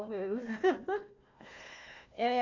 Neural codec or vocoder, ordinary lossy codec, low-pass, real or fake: codec, 16 kHz, 1 kbps, FunCodec, trained on Chinese and English, 50 frames a second; none; 7.2 kHz; fake